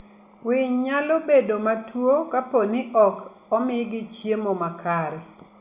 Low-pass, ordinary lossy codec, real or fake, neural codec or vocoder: 3.6 kHz; Opus, 64 kbps; real; none